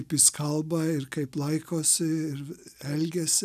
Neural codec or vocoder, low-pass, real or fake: none; 14.4 kHz; real